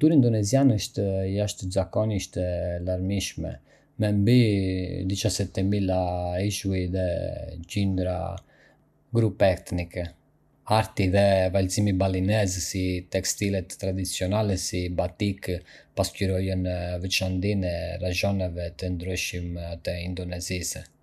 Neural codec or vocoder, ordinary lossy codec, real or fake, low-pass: none; none; real; 14.4 kHz